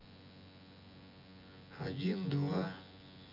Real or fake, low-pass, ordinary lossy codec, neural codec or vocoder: fake; 5.4 kHz; none; vocoder, 24 kHz, 100 mel bands, Vocos